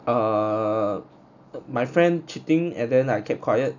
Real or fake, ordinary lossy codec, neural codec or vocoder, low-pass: real; none; none; 7.2 kHz